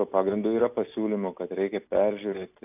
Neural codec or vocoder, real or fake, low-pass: none; real; 3.6 kHz